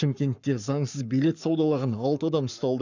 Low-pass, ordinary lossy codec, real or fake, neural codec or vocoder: 7.2 kHz; none; fake; codec, 44.1 kHz, 7.8 kbps, Pupu-Codec